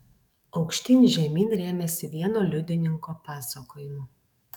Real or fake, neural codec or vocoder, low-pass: fake; codec, 44.1 kHz, 7.8 kbps, DAC; 19.8 kHz